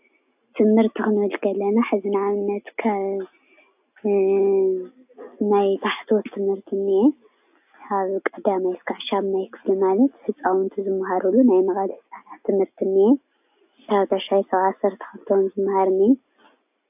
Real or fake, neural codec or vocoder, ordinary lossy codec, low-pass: real; none; AAC, 32 kbps; 3.6 kHz